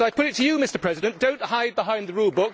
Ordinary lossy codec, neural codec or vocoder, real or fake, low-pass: none; none; real; none